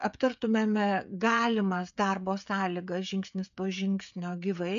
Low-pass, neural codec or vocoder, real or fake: 7.2 kHz; codec, 16 kHz, 16 kbps, FreqCodec, smaller model; fake